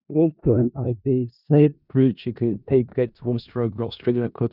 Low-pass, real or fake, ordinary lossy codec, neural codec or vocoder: 5.4 kHz; fake; none; codec, 16 kHz in and 24 kHz out, 0.4 kbps, LongCat-Audio-Codec, four codebook decoder